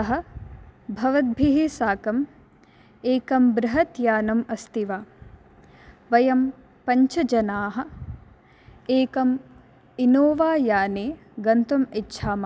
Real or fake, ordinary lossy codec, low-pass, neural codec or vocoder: real; none; none; none